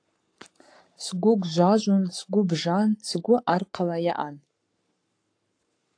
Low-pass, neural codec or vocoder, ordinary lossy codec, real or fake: 9.9 kHz; codec, 44.1 kHz, 7.8 kbps, Pupu-Codec; AAC, 48 kbps; fake